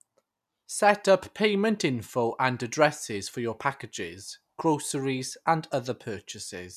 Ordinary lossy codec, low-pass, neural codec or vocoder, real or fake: none; 14.4 kHz; none; real